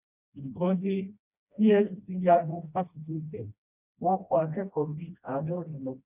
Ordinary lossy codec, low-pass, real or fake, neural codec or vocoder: none; 3.6 kHz; fake; codec, 16 kHz, 1 kbps, FreqCodec, smaller model